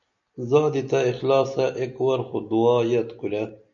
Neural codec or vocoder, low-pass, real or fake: none; 7.2 kHz; real